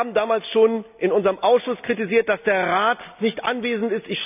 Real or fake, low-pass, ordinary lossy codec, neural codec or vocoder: real; 3.6 kHz; none; none